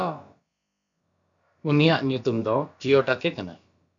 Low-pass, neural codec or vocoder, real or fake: 7.2 kHz; codec, 16 kHz, about 1 kbps, DyCAST, with the encoder's durations; fake